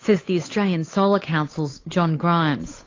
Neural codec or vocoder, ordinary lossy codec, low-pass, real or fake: codec, 16 kHz, 8 kbps, FunCodec, trained on Chinese and English, 25 frames a second; AAC, 32 kbps; 7.2 kHz; fake